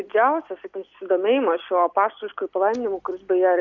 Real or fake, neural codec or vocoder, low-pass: real; none; 7.2 kHz